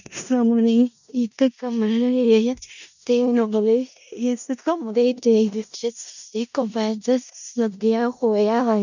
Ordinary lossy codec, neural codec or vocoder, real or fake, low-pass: none; codec, 16 kHz in and 24 kHz out, 0.4 kbps, LongCat-Audio-Codec, four codebook decoder; fake; 7.2 kHz